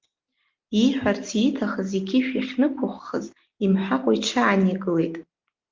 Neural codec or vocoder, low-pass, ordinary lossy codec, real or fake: none; 7.2 kHz; Opus, 32 kbps; real